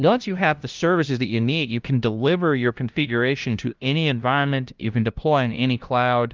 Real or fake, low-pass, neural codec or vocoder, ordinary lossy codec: fake; 7.2 kHz; codec, 16 kHz, 0.5 kbps, FunCodec, trained on LibriTTS, 25 frames a second; Opus, 32 kbps